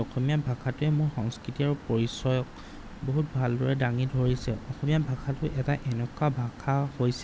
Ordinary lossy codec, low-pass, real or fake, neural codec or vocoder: none; none; real; none